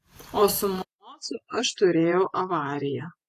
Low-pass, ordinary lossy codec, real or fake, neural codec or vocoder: 14.4 kHz; AAC, 48 kbps; fake; vocoder, 48 kHz, 128 mel bands, Vocos